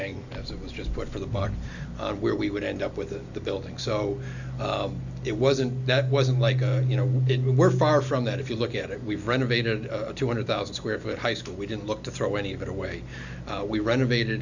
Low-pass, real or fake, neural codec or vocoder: 7.2 kHz; real; none